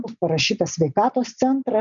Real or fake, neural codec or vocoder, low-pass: real; none; 7.2 kHz